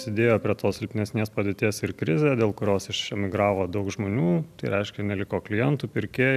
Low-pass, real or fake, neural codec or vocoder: 14.4 kHz; real; none